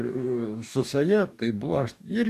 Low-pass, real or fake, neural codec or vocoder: 14.4 kHz; fake; codec, 44.1 kHz, 2.6 kbps, DAC